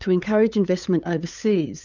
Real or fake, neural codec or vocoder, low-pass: fake; codec, 16 kHz, 8 kbps, FunCodec, trained on LibriTTS, 25 frames a second; 7.2 kHz